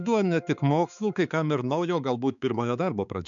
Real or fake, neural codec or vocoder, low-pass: fake; codec, 16 kHz, 4 kbps, X-Codec, HuBERT features, trained on balanced general audio; 7.2 kHz